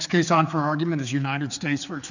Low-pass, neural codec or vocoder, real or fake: 7.2 kHz; codec, 16 kHz, 4 kbps, X-Codec, HuBERT features, trained on general audio; fake